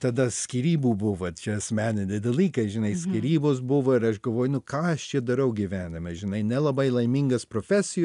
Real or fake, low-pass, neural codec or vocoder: real; 10.8 kHz; none